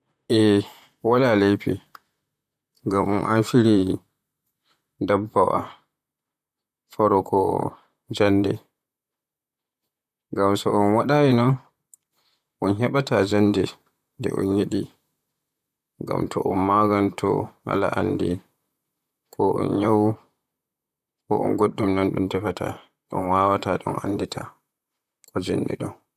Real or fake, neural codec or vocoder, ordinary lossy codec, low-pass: fake; vocoder, 44.1 kHz, 128 mel bands, Pupu-Vocoder; none; 14.4 kHz